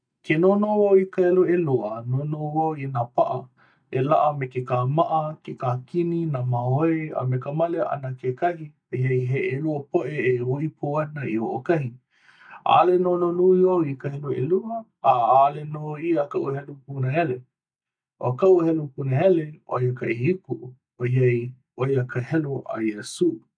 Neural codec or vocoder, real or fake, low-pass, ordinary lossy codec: none; real; 9.9 kHz; none